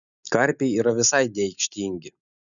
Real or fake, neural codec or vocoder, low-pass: real; none; 7.2 kHz